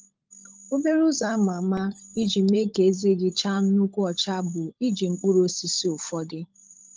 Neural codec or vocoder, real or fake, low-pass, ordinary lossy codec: codec, 16 kHz, 16 kbps, FunCodec, trained on LibriTTS, 50 frames a second; fake; 7.2 kHz; Opus, 24 kbps